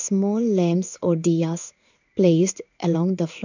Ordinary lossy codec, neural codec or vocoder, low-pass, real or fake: none; codec, 16 kHz in and 24 kHz out, 1 kbps, XY-Tokenizer; 7.2 kHz; fake